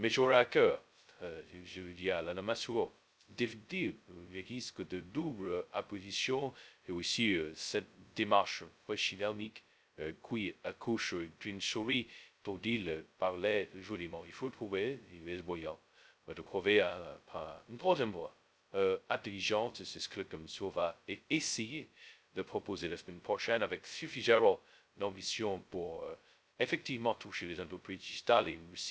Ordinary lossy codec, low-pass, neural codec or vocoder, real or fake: none; none; codec, 16 kHz, 0.2 kbps, FocalCodec; fake